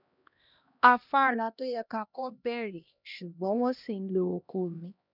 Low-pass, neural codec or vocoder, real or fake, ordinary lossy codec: 5.4 kHz; codec, 16 kHz, 1 kbps, X-Codec, HuBERT features, trained on LibriSpeech; fake; none